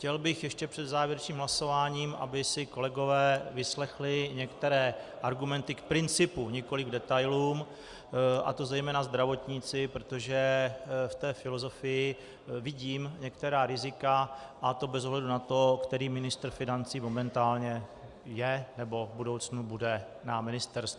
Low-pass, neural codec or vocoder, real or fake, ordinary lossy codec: 10.8 kHz; none; real; Opus, 64 kbps